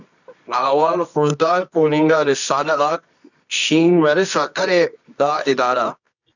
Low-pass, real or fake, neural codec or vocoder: 7.2 kHz; fake; codec, 24 kHz, 0.9 kbps, WavTokenizer, medium music audio release